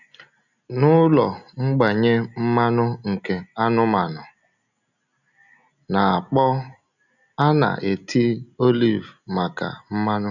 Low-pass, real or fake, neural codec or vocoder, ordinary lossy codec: 7.2 kHz; real; none; none